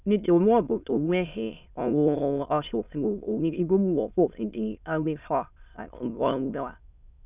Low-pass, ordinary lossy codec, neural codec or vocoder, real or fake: 3.6 kHz; none; autoencoder, 22.05 kHz, a latent of 192 numbers a frame, VITS, trained on many speakers; fake